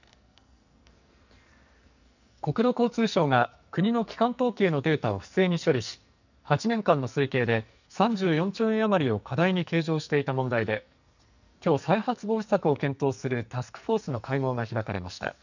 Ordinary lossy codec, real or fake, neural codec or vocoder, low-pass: none; fake; codec, 44.1 kHz, 2.6 kbps, SNAC; 7.2 kHz